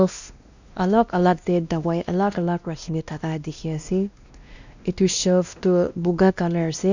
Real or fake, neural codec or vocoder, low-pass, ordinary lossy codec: fake; codec, 16 kHz, 1 kbps, X-Codec, WavLM features, trained on Multilingual LibriSpeech; 7.2 kHz; none